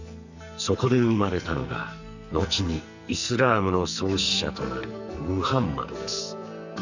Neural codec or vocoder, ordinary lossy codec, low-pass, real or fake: codec, 44.1 kHz, 2.6 kbps, SNAC; none; 7.2 kHz; fake